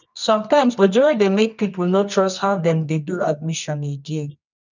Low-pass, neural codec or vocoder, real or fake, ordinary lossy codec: 7.2 kHz; codec, 24 kHz, 0.9 kbps, WavTokenizer, medium music audio release; fake; none